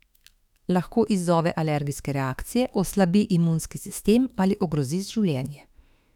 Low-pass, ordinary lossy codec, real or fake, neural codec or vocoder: 19.8 kHz; none; fake; autoencoder, 48 kHz, 32 numbers a frame, DAC-VAE, trained on Japanese speech